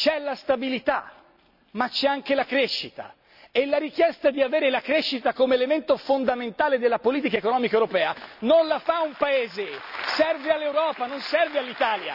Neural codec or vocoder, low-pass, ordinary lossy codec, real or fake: none; 5.4 kHz; none; real